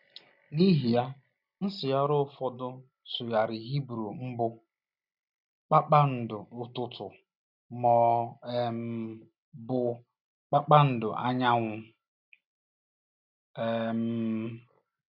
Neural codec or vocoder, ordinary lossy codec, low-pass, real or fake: none; none; 5.4 kHz; real